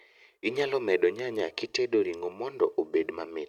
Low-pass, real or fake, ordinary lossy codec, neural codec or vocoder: 19.8 kHz; real; none; none